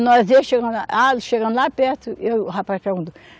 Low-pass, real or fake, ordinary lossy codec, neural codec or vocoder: none; real; none; none